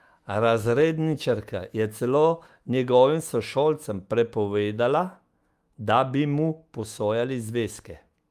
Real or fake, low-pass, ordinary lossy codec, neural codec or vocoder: fake; 14.4 kHz; Opus, 32 kbps; autoencoder, 48 kHz, 128 numbers a frame, DAC-VAE, trained on Japanese speech